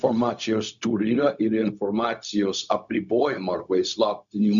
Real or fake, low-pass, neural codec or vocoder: fake; 7.2 kHz; codec, 16 kHz, 0.4 kbps, LongCat-Audio-Codec